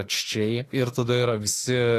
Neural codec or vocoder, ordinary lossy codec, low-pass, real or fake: autoencoder, 48 kHz, 32 numbers a frame, DAC-VAE, trained on Japanese speech; AAC, 48 kbps; 14.4 kHz; fake